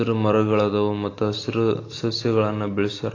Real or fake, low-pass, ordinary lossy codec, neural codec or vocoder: real; 7.2 kHz; AAC, 32 kbps; none